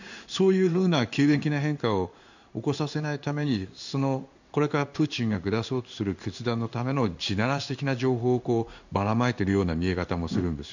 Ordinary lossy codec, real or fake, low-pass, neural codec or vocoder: none; fake; 7.2 kHz; codec, 16 kHz in and 24 kHz out, 1 kbps, XY-Tokenizer